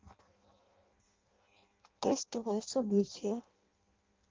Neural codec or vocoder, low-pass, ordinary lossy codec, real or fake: codec, 16 kHz in and 24 kHz out, 0.6 kbps, FireRedTTS-2 codec; 7.2 kHz; Opus, 24 kbps; fake